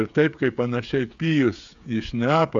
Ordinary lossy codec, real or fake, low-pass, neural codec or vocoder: AAC, 64 kbps; fake; 7.2 kHz; codec, 16 kHz, 8 kbps, FreqCodec, smaller model